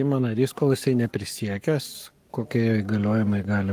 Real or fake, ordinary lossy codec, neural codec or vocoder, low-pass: fake; Opus, 32 kbps; codec, 44.1 kHz, 7.8 kbps, Pupu-Codec; 14.4 kHz